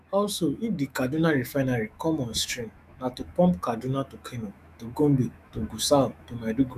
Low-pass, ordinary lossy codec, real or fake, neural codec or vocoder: 14.4 kHz; none; fake; autoencoder, 48 kHz, 128 numbers a frame, DAC-VAE, trained on Japanese speech